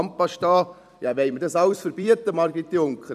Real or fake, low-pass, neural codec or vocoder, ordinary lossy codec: fake; 14.4 kHz; vocoder, 44.1 kHz, 128 mel bands every 256 samples, BigVGAN v2; none